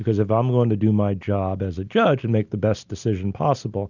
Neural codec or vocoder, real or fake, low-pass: none; real; 7.2 kHz